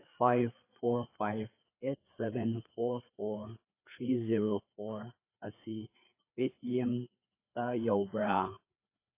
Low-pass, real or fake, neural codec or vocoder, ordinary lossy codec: 3.6 kHz; fake; codec, 16 kHz, 4 kbps, FreqCodec, larger model; AAC, 24 kbps